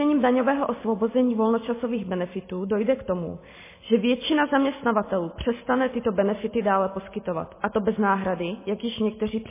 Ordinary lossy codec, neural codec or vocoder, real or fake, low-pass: MP3, 16 kbps; none; real; 3.6 kHz